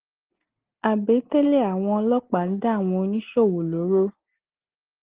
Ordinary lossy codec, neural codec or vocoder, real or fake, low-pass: Opus, 16 kbps; none; real; 3.6 kHz